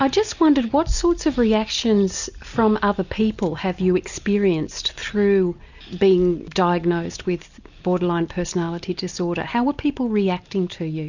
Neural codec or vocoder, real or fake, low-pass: none; real; 7.2 kHz